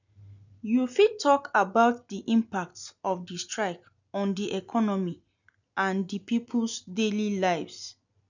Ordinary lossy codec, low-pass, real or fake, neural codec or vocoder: none; 7.2 kHz; real; none